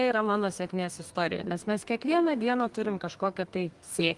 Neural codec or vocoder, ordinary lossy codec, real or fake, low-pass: codec, 32 kHz, 1.9 kbps, SNAC; Opus, 24 kbps; fake; 10.8 kHz